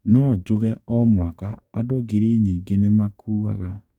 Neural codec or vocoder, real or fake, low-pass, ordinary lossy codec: codec, 44.1 kHz, 2.6 kbps, DAC; fake; 19.8 kHz; none